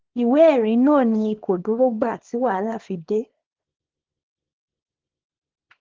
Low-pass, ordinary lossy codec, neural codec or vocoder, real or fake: 7.2 kHz; Opus, 16 kbps; codec, 24 kHz, 0.9 kbps, WavTokenizer, small release; fake